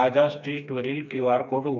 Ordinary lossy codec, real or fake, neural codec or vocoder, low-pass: none; fake; codec, 16 kHz, 2 kbps, FreqCodec, smaller model; 7.2 kHz